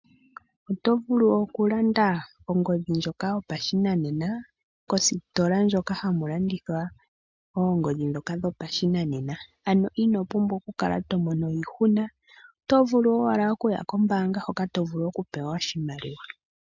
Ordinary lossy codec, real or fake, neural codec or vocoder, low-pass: MP3, 64 kbps; real; none; 7.2 kHz